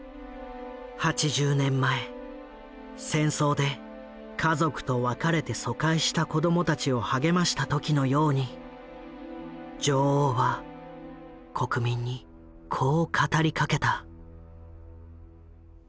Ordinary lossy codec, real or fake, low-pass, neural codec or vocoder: none; real; none; none